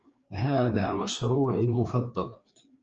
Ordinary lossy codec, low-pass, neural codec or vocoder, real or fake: Opus, 24 kbps; 7.2 kHz; codec, 16 kHz, 2 kbps, FreqCodec, larger model; fake